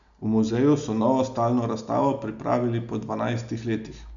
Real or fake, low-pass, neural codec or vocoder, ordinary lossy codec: real; 7.2 kHz; none; none